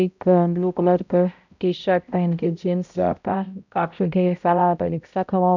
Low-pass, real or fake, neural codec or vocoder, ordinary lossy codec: 7.2 kHz; fake; codec, 16 kHz, 0.5 kbps, X-Codec, HuBERT features, trained on balanced general audio; none